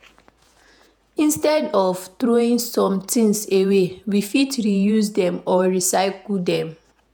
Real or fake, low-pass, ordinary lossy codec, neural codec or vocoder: fake; none; none; vocoder, 48 kHz, 128 mel bands, Vocos